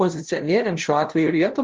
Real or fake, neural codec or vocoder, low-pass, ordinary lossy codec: fake; codec, 16 kHz, 0.5 kbps, FunCodec, trained on LibriTTS, 25 frames a second; 7.2 kHz; Opus, 16 kbps